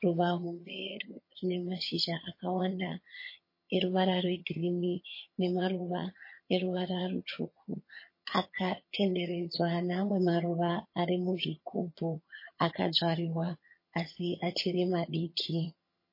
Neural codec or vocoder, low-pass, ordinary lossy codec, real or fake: vocoder, 22.05 kHz, 80 mel bands, HiFi-GAN; 5.4 kHz; MP3, 24 kbps; fake